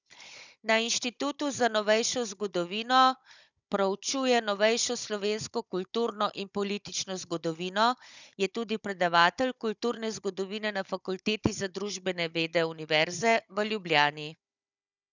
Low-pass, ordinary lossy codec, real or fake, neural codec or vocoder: 7.2 kHz; none; fake; codec, 16 kHz, 16 kbps, FunCodec, trained on Chinese and English, 50 frames a second